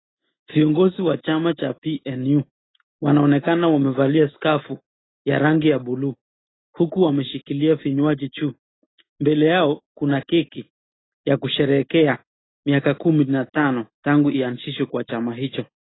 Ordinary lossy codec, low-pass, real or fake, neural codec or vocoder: AAC, 16 kbps; 7.2 kHz; real; none